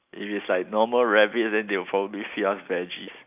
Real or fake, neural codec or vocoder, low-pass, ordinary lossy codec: real; none; 3.6 kHz; AAC, 32 kbps